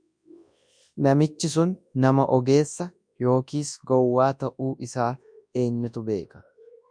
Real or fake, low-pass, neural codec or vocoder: fake; 9.9 kHz; codec, 24 kHz, 0.9 kbps, WavTokenizer, large speech release